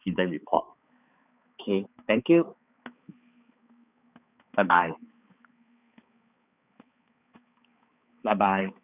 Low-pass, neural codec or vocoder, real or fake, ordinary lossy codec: 3.6 kHz; codec, 16 kHz, 4 kbps, X-Codec, HuBERT features, trained on balanced general audio; fake; none